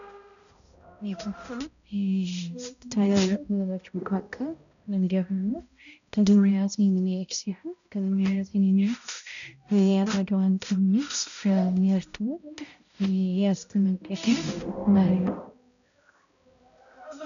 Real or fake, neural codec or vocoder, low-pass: fake; codec, 16 kHz, 0.5 kbps, X-Codec, HuBERT features, trained on balanced general audio; 7.2 kHz